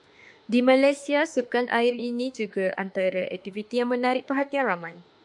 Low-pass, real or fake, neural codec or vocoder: 10.8 kHz; fake; autoencoder, 48 kHz, 32 numbers a frame, DAC-VAE, trained on Japanese speech